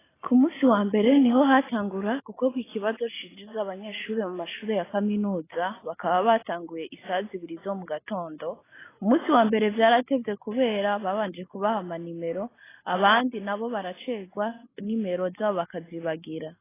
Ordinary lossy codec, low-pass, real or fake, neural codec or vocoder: AAC, 16 kbps; 3.6 kHz; real; none